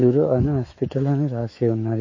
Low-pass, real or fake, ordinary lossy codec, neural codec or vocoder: 7.2 kHz; fake; MP3, 32 kbps; autoencoder, 48 kHz, 128 numbers a frame, DAC-VAE, trained on Japanese speech